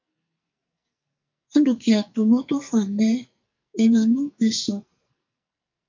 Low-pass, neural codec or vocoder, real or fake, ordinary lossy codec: 7.2 kHz; codec, 44.1 kHz, 2.6 kbps, SNAC; fake; MP3, 64 kbps